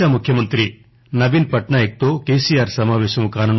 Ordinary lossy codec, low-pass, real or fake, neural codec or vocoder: MP3, 24 kbps; 7.2 kHz; real; none